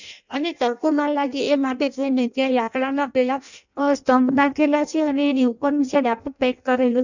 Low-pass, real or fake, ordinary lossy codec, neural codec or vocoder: 7.2 kHz; fake; none; codec, 16 kHz in and 24 kHz out, 0.6 kbps, FireRedTTS-2 codec